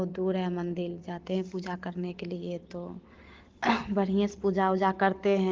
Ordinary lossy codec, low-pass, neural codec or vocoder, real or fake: Opus, 16 kbps; 7.2 kHz; none; real